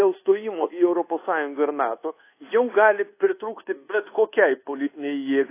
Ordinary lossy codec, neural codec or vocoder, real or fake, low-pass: AAC, 24 kbps; codec, 24 kHz, 1.2 kbps, DualCodec; fake; 3.6 kHz